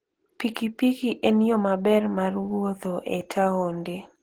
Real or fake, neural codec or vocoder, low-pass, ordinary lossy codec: real; none; 19.8 kHz; Opus, 16 kbps